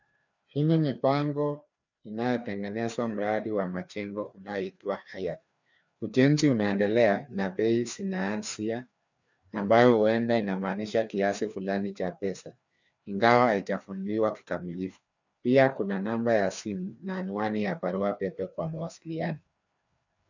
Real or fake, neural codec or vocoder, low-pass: fake; codec, 16 kHz, 2 kbps, FreqCodec, larger model; 7.2 kHz